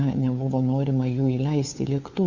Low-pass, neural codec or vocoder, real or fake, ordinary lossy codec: 7.2 kHz; codec, 16 kHz, 4 kbps, FunCodec, trained on LibriTTS, 50 frames a second; fake; Opus, 64 kbps